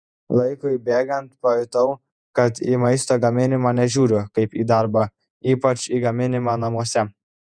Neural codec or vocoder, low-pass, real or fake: vocoder, 48 kHz, 128 mel bands, Vocos; 9.9 kHz; fake